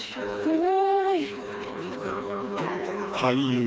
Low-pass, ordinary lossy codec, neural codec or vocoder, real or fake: none; none; codec, 16 kHz, 2 kbps, FreqCodec, smaller model; fake